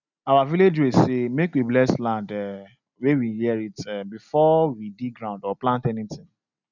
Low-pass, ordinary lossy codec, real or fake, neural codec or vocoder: 7.2 kHz; none; real; none